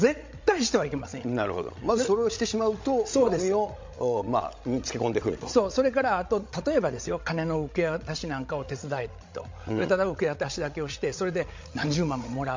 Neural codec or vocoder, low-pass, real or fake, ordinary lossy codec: codec, 16 kHz, 16 kbps, FreqCodec, larger model; 7.2 kHz; fake; MP3, 48 kbps